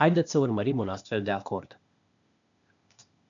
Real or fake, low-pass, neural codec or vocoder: fake; 7.2 kHz; codec, 16 kHz, 0.8 kbps, ZipCodec